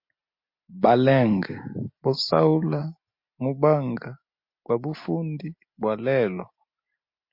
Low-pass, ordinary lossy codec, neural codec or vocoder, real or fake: 5.4 kHz; MP3, 32 kbps; none; real